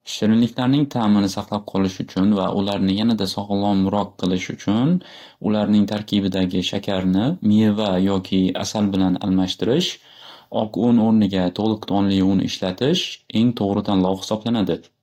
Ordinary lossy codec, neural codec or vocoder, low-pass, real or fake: AAC, 48 kbps; none; 19.8 kHz; real